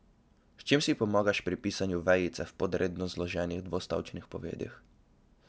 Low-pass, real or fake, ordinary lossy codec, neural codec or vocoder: none; real; none; none